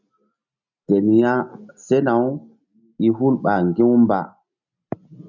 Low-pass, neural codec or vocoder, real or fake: 7.2 kHz; none; real